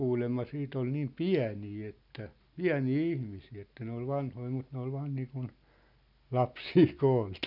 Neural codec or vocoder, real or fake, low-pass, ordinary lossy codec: none; real; 5.4 kHz; none